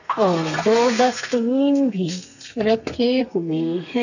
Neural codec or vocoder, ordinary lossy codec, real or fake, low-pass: codec, 32 kHz, 1.9 kbps, SNAC; none; fake; 7.2 kHz